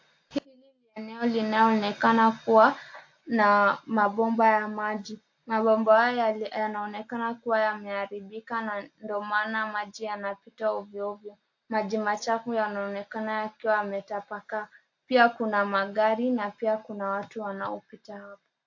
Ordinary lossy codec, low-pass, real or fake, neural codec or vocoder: AAC, 48 kbps; 7.2 kHz; real; none